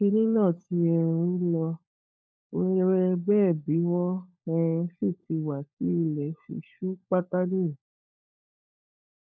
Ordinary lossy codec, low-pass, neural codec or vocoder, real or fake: none; none; codec, 16 kHz, 16 kbps, FunCodec, trained on LibriTTS, 50 frames a second; fake